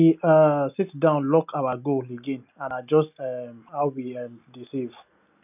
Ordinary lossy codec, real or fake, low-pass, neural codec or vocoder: none; real; 3.6 kHz; none